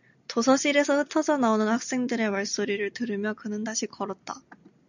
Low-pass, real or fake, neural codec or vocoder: 7.2 kHz; real; none